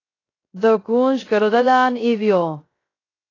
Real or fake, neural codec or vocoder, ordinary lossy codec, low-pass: fake; codec, 16 kHz, 0.3 kbps, FocalCodec; AAC, 32 kbps; 7.2 kHz